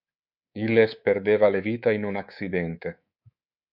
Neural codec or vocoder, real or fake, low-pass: codec, 24 kHz, 3.1 kbps, DualCodec; fake; 5.4 kHz